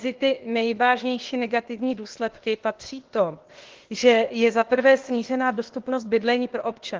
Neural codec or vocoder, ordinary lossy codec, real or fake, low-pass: codec, 16 kHz, 0.8 kbps, ZipCodec; Opus, 16 kbps; fake; 7.2 kHz